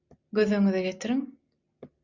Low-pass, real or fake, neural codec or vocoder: 7.2 kHz; real; none